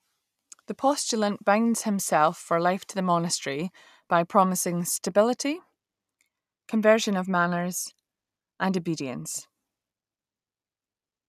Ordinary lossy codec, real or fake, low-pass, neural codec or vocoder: none; real; 14.4 kHz; none